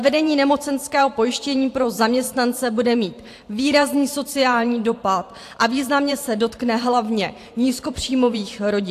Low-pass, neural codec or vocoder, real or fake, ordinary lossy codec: 14.4 kHz; vocoder, 44.1 kHz, 128 mel bands every 512 samples, BigVGAN v2; fake; AAC, 64 kbps